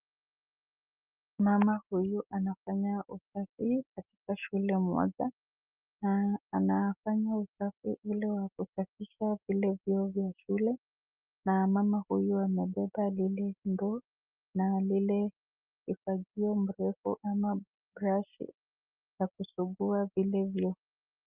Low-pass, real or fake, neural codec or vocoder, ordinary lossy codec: 3.6 kHz; real; none; Opus, 24 kbps